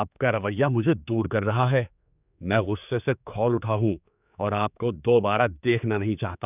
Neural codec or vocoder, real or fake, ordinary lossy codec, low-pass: codec, 16 kHz, 4 kbps, X-Codec, HuBERT features, trained on general audio; fake; none; 3.6 kHz